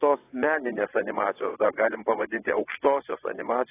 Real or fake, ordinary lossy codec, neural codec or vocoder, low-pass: fake; AAC, 16 kbps; vocoder, 22.05 kHz, 80 mel bands, WaveNeXt; 3.6 kHz